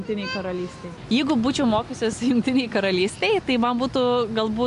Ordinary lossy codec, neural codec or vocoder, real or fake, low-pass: MP3, 64 kbps; none; real; 10.8 kHz